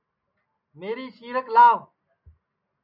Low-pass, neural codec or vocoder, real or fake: 5.4 kHz; none; real